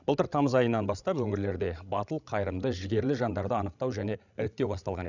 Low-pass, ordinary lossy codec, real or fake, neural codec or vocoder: 7.2 kHz; none; fake; codec, 16 kHz, 16 kbps, FreqCodec, larger model